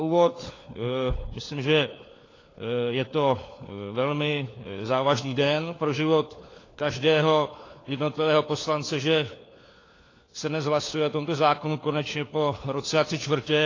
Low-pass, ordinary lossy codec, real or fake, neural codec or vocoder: 7.2 kHz; AAC, 32 kbps; fake; codec, 16 kHz, 4 kbps, FunCodec, trained on LibriTTS, 50 frames a second